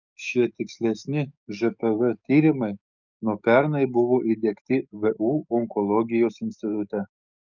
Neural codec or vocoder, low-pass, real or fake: codec, 44.1 kHz, 7.8 kbps, DAC; 7.2 kHz; fake